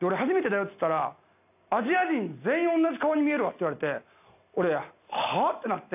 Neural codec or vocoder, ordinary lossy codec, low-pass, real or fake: none; AAC, 32 kbps; 3.6 kHz; real